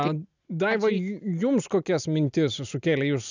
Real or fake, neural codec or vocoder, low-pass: real; none; 7.2 kHz